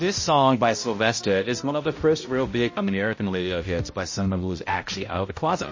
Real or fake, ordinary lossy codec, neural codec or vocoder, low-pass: fake; MP3, 32 kbps; codec, 16 kHz, 0.5 kbps, X-Codec, HuBERT features, trained on balanced general audio; 7.2 kHz